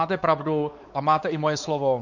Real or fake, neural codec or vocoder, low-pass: fake; codec, 16 kHz, 4 kbps, X-Codec, WavLM features, trained on Multilingual LibriSpeech; 7.2 kHz